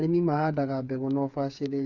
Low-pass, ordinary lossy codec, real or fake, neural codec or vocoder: 7.2 kHz; none; fake; codec, 16 kHz, 8 kbps, FreqCodec, smaller model